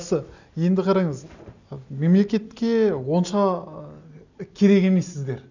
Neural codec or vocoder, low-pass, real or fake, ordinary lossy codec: none; 7.2 kHz; real; none